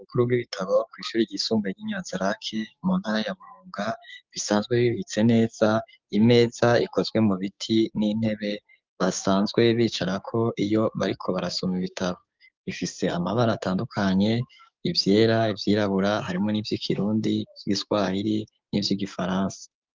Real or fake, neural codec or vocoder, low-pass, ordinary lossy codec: fake; codec, 16 kHz, 6 kbps, DAC; 7.2 kHz; Opus, 24 kbps